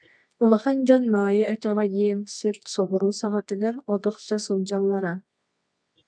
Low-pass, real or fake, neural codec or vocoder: 9.9 kHz; fake; codec, 24 kHz, 0.9 kbps, WavTokenizer, medium music audio release